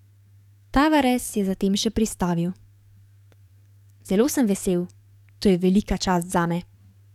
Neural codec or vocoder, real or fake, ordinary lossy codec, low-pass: codec, 44.1 kHz, 7.8 kbps, DAC; fake; none; 19.8 kHz